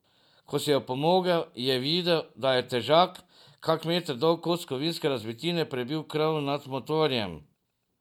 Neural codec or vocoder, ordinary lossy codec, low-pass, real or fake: none; none; 19.8 kHz; real